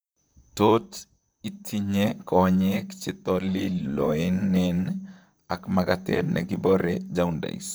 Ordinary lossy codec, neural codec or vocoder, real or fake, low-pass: none; vocoder, 44.1 kHz, 128 mel bands, Pupu-Vocoder; fake; none